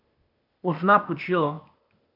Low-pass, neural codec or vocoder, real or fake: 5.4 kHz; codec, 16 kHz, 0.8 kbps, ZipCodec; fake